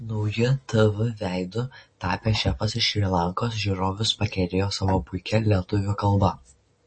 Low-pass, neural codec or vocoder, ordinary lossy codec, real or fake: 9.9 kHz; none; MP3, 32 kbps; real